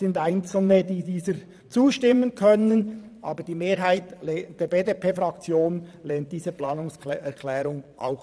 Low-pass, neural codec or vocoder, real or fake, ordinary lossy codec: none; vocoder, 22.05 kHz, 80 mel bands, Vocos; fake; none